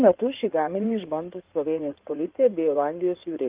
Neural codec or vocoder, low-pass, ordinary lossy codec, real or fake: codec, 16 kHz in and 24 kHz out, 2.2 kbps, FireRedTTS-2 codec; 3.6 kHz; Opus, 32 kbps; fake